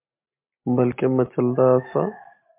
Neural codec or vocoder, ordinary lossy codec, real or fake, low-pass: none; MP3, 24 kbps; real; 3.6 kHz